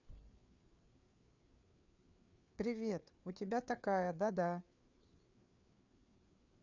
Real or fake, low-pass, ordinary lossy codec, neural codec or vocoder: fake; 7.2 kHz; none; codec, 16 kHz, 4 kbps, FreqCodec, larger model